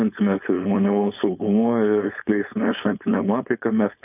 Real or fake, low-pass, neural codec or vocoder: fake; 3.6 kHz; codec, 16 kHz, 4.8 kbps, FACodec